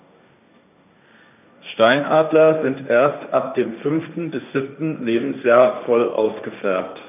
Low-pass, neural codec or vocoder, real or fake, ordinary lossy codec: 3.6 kHz; codec, 16 kHz, 1.1 kbps, Voila-Tokenizer; fake; none